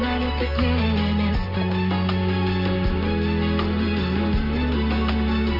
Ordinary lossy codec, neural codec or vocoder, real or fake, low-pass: none; none; real; 5.4 kHz